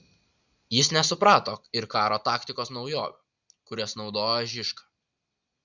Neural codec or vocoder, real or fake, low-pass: none; real; 7.2 kHz